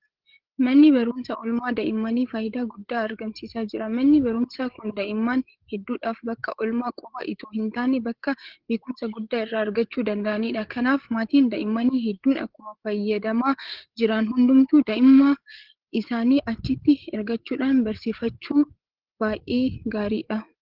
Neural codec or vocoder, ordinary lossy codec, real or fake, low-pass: codec, 16 kHz, 8 kbps, FreqCodec, larger model; Opus, 16 kbps; fake; 5.4 kHz